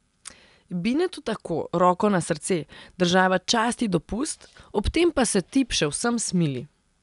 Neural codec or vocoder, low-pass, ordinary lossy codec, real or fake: none; 10.8 kHz; none; real